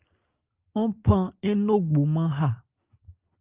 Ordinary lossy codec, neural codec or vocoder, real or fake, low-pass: Opus, 32 kbps; none; real; 3.6 kHz